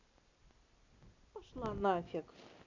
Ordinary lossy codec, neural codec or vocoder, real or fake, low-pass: MP3, 64 kbps; none; real; 7.2 kHz